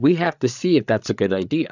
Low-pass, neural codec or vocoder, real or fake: 7.2 kHz; codec, 16 kHz, 8 kbps, FreqCodec, larger model; fake